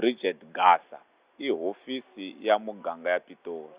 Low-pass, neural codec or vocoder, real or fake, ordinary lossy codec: 3.6 kHz; none; real; Opus, 64 kbps